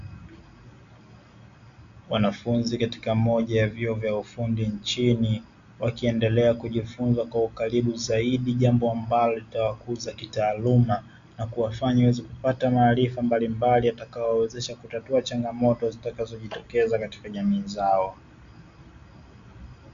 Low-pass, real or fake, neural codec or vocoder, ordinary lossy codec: 7.2 kHz; real; none; AAC, 96 kbps